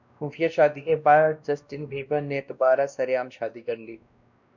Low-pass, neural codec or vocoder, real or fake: 7.2 kHz; codec, 16 kHz, 1 kbps, X-Codec, WavLM features, trained on Multilingual LibriSpeech; fake